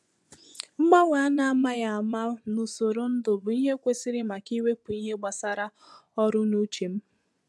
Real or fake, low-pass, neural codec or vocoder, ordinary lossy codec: fake; none; vocoder, 24 kHz, 100 mel bands, Vocos; none